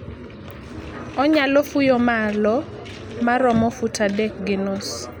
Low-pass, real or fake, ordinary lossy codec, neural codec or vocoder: 19.8 kHz; real; none; none